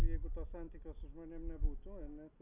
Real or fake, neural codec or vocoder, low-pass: real; none; 3.6 kHz